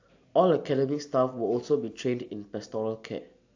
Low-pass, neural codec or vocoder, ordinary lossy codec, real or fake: 7.2 kHz; none; AAC, 48 kbps; real